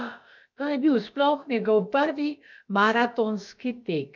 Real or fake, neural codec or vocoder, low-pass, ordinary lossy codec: fake; codec, 16 kHz, about 1 kbps, DyCAST, with the encoder's durations; 7.2 kHz; none